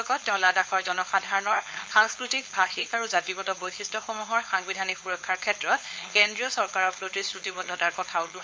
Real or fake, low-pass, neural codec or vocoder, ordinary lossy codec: fake; none; codec, 16 kHz, 4.8 kbps, FACodec; none